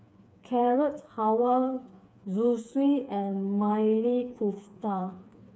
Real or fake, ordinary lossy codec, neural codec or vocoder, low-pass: fake; none; codec, 16 kHz, 4 kbps, FreqCodec, smaller model; none